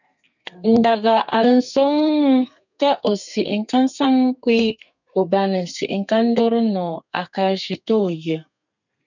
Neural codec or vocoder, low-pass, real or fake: codec, 32 kHz, 1.9 kbps, SNAC; 7.2 kHz; fake